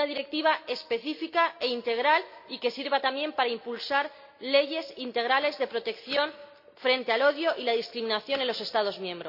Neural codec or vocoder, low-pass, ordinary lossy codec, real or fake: none; 5.4 kHz; none; real